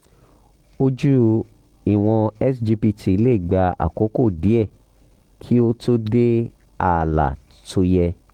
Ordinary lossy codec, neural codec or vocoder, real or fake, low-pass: Opus, 16 kbps; none; real; 19.8 kHz